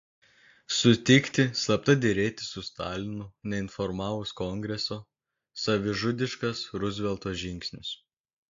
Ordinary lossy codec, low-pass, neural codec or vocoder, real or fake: AAC, 48 kbps; 7.2 kHz; none; real